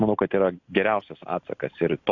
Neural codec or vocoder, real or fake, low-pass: none; real; 7.2 kHz